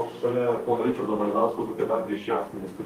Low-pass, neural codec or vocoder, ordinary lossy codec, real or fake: 14.4 kHz; codec, 32 kHz, 1.9 kbps, SNAC; Opus, 32 kbps; fake